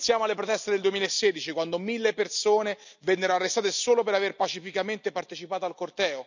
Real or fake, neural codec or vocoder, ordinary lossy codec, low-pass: real; none; none; 7.2 kHz